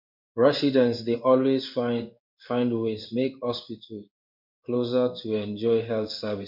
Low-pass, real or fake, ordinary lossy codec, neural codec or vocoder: 5.4 kHz; fake; MP3, 48 kbps; codec, 16 kHz in and 24 kHz out, 1 kbps, XY-Tokenizer